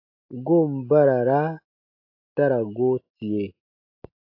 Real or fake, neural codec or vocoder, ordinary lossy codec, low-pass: real; none; AAC, 32 kbps; 5.4 kHz